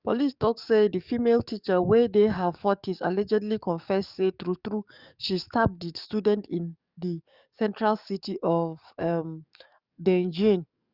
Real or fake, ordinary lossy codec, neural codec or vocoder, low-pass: fake; Opus, 64 kbps; codec, 44.1 kHz, 7.8 kbps, DAC; 5.4 kHz